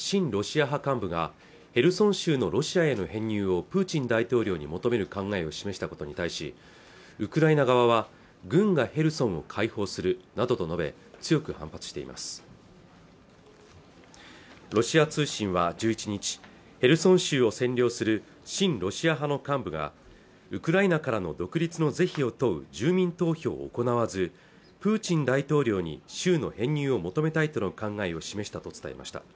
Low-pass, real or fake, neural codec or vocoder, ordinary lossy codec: none; real; none; none